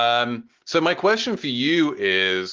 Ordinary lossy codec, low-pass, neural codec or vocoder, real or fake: Opus, 16 kbps; 7.2 kHz; none; real